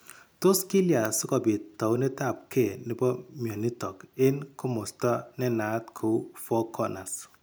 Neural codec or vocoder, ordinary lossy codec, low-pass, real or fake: none; none; none; real